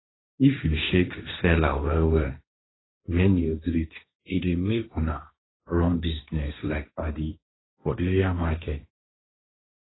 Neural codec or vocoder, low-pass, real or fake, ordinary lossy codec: codec, 16 kHz, 1.1 kbps, Voila-Tokenizer; 7.2 kHz; fake; AAC, 16 kbps